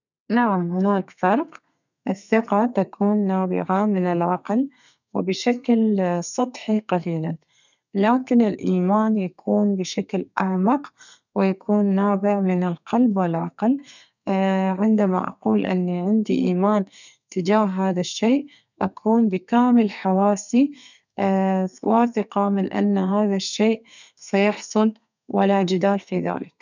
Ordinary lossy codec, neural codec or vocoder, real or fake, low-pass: none; codec, 32 kHz, 1.9 kbps, SNAC; fake; 7.2 kHz